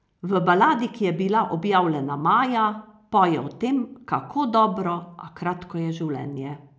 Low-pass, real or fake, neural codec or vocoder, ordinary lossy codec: none; real; none; none